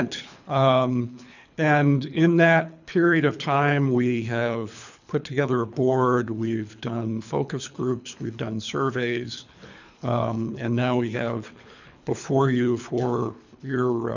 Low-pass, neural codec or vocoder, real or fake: 7.2 kHz; codec, 24 kHz, 3 kbps, HILCodec; fake